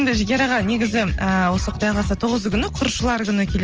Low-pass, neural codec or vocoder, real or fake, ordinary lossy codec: 7.2 kHz; none; real; Opus, 24 kbps